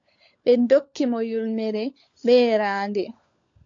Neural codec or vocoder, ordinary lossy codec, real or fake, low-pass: codec, 16 kHz, 16 kbps, FunCodec, trained on LibriTTS, 50 frames a second; AAC, 48 kbps; fake; 7.2 kHz